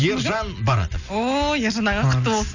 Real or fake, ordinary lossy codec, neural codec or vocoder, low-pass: real; none; none; 7.2 kHz